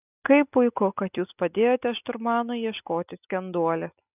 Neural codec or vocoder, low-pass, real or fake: none; 3.6 kHz; real